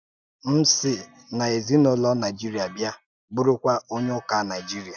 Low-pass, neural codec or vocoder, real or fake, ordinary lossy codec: 7.2 kHz; none; real; none